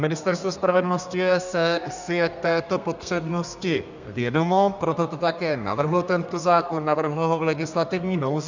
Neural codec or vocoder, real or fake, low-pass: codec, 32 kHz, 1.9 kbps, SNAC; fake; 7.2 kHz